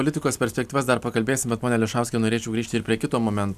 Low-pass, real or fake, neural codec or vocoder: 14.4 kHz; fake; vocoder, 44.1 kHz, 128 mel bands every 256 samples, BigVGAN v2